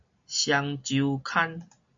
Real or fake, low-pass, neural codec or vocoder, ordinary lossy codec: real; 7.2 kHz; none; MP3, 48 kbps